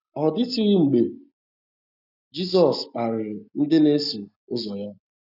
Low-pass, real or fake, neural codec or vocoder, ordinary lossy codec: 5.4 kHz; real; none; none